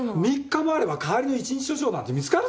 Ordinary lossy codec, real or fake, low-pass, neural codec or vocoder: none; real; none; none